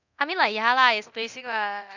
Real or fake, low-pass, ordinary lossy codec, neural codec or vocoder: fake; 7.2 kHz; none; codec, 24 kHz, 0.9 kbps, DualCodec